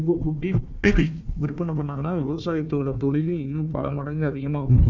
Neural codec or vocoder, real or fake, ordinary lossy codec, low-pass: codec, 16 kHz, 1 kbps, FunCodec, trained on Chinese and English, 50 frames a second; fake; none; 7.2 kHz